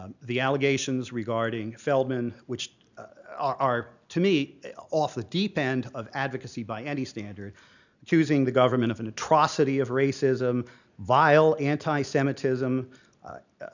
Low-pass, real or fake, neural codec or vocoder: 7.2 kHz; real; none